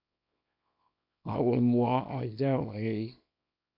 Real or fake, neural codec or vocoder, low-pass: fake; codec, 24 kHz, 0.9 kbps, WavTokenizer, small release; 5.4 kHz